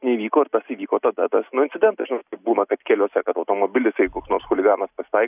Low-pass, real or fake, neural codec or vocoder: 3.6 kHz; real; none